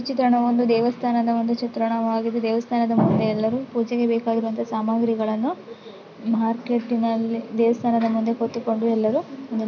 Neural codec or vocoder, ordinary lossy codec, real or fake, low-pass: none; none; real; 7.2 kHz